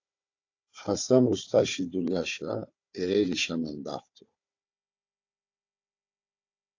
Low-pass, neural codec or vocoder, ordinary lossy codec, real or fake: 7.2 kHz; codec, 16 kHz, 4 kbps, FunCodec, trained on Chinese and English, 50 frames a second; AAC, 48 kbps; fake